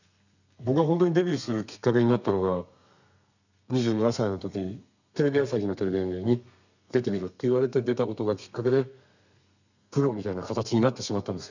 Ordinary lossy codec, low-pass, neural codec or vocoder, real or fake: none; 7.2 kHz; codec, 32 kHz, 1.9 kbps, SNAC; fake